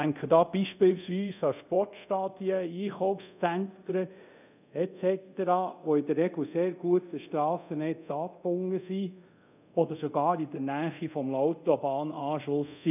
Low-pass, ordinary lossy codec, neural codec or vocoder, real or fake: 3.6 kHz; none; codec, 24 kHz, 0.5 kbps, DualCodec; fake